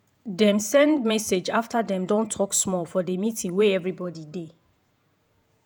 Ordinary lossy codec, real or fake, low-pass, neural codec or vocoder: none; fake; none; vocoder, 48 kHz, 128 mel bands, Vocos